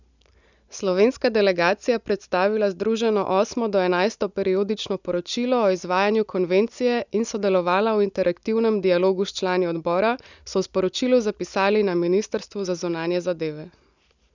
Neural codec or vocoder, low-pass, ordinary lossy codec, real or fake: none; 7.2 kHz; none; real